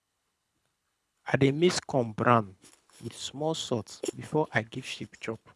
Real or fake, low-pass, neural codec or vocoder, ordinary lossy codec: fake; none; codec, 24 kHz, 6 kbps, HILCodec; none